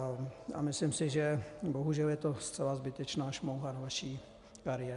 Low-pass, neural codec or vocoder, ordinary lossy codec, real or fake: 10.8 kHz; none; MP3, 96 kbps; real